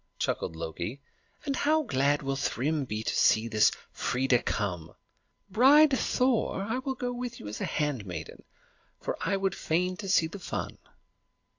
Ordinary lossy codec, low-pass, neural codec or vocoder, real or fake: AAC, 48 kbps; 7.2 kHz; none; real